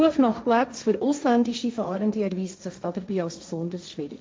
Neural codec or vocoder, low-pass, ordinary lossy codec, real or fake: codec, 16 kHz, 1.1 kbps, Voila-Tokenizer; none; none; fake